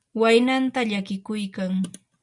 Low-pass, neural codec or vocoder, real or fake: 10.8 kHz; none; real